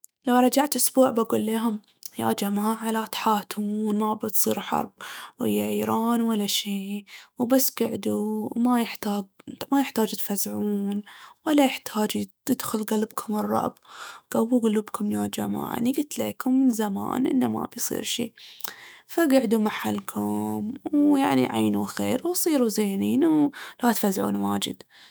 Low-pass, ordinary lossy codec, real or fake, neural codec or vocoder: none; none; fake; autoencoder, 48 kHz, 128 numbers a frame, DAC-VAE, trained on Japanese speech